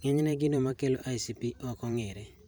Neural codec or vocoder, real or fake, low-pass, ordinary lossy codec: vocoder, 44.1 kHz, 128 mel bands every 512 samples, BigVGAN v2; fake; none; none